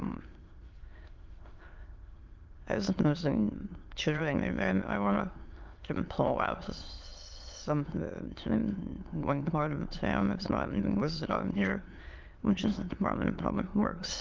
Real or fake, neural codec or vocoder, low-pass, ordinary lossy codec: fake; autoencoder, 22.05 kHz, a latent of 192 numbers a frame, VITS, trained on many speakers; 7.2 kHz; Opus, 32 kbps